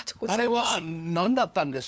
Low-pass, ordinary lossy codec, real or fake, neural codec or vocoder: none; none; fake; codec, 16 kHz, 2 kbps, FunCodec, trained on LibriTTS, 25 frames a second